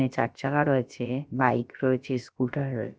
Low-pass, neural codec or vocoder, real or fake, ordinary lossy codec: none; codec, 16 kHz, about 1 kbps, DyCAST, with the encoder's durations; fake; none